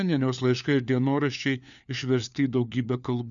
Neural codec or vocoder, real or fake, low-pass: codec, 16 kHz, 4 kbps, FunCodec, trained on LibriTTS, 50 frames a second; fake; 7.2 kHz